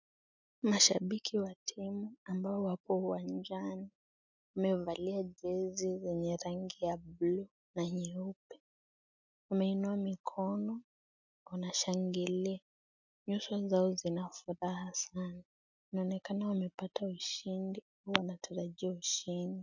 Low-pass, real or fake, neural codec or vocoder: 7.2 kHz; real; none